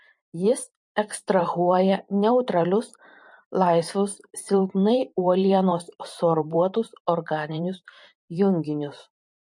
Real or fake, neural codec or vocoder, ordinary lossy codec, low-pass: fake; vocoder, 44.1 kHz, 128 mel bands every 512 samples, BigVGAN v2; MP3, 48 kbps; 10.8 kHz